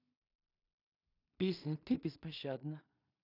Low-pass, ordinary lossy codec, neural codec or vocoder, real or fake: 5.4 kHz; none; codec, 16 kHz in and 24 kHz out, 0.4 kbps, LongCat-Audio-Codec, two codebook decoder; fake